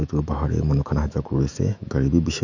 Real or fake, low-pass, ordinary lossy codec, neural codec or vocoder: fake; 7.2 kHz; none; autoencoder, 48 kHz, 128 numbers a frame, DAC-VAE, trained on Japanese speech